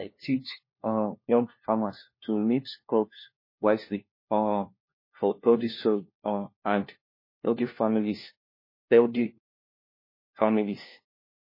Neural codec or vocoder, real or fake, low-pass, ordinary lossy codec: codec, 16 kHz, 1 kbps, FunCodec, trained on LibriTTS, 50 frames a second; fake; 5.4 kHz; MP3, 24 kbps